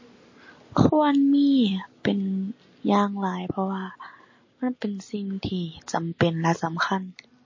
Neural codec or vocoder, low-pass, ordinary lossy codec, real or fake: none; 7.2 kHz; MP3, 32 kbps; real